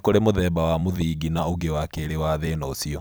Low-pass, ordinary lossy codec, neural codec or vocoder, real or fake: none; none; vocoder, 44.1 kHz, 128 mel bands every 256 samples, BigVGAN v2; fake